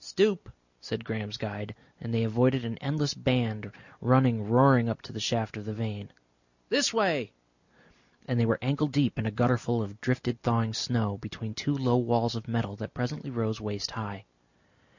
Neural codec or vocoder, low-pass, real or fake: none; 7.2 kHz; real